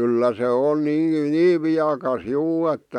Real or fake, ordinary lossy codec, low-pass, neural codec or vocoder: real; none; 19.8 kHz; none